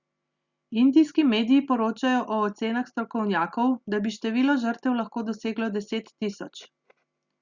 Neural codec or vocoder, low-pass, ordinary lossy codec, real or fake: none; 7.2 kHz; Opus, 64 kbps; real